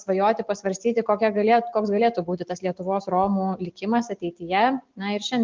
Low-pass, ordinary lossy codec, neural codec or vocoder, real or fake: 7.2 kHz; Opus, 32 kbps; none; real